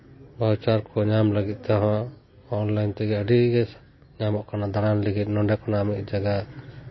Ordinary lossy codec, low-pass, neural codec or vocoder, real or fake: MP3, 24 kbps; 7.2 kHz; none; real